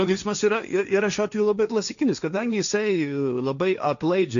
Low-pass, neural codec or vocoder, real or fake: 7.2 kHz; codec, 16 kHz, 1.1 kbps, Voila-Tokenizer; fake